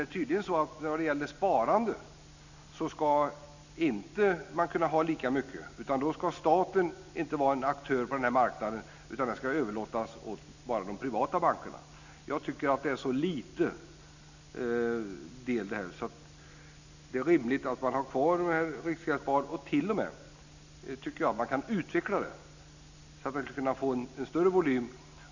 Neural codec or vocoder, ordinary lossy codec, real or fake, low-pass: none; none; real; 7.2 kHz